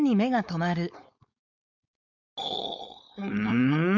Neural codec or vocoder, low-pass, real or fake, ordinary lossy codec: codec, 16 kHz, 4.8 kbps, FACodec; 7.2 kHz; fake; none